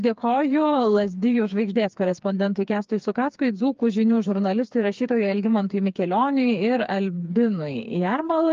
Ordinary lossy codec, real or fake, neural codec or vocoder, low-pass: Opus, 24 kbps; fake; codec, 16 kHz, 4 kbps, FreqCodec, smaller model; 7.2 kHz